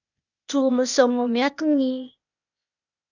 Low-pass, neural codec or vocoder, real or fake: 7.2 kHz; codec, 16 kHz, 0.8 kbps, ZipCodec; fake